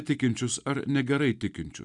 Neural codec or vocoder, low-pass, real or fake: none; 10.8 kHz; real